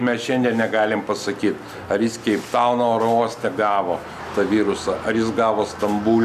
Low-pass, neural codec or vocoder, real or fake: 14.4 kHz; none; real